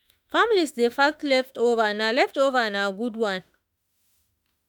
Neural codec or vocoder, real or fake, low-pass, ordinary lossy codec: autoencoder, 48 kHz, 32 numbers a frame, DAC-VAE, trained on Japanese speech; fake; none; none